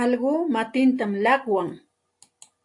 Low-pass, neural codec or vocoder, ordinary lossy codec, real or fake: 10.8 kHz; none; AAC, 64 kbps; real